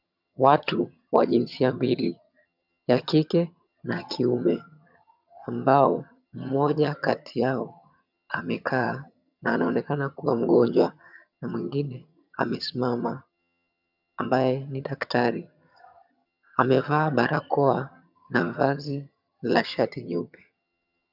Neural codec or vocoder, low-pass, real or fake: vocoder, 22.05 kHz, 80 mel bands, HiFi-GAN; 5.4 kHz; fake